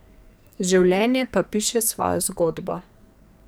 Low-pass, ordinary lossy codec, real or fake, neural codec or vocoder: none; none; fake; codec, 44.1 kHz, 2.6 kbps, SNAC